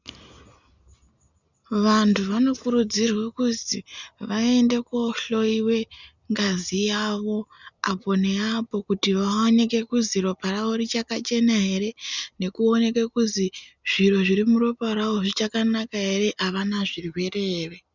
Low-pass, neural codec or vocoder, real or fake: 7.2 kHz; none; real